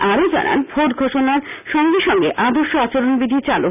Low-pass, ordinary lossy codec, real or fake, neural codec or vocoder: 3.6 kHz; none; real; none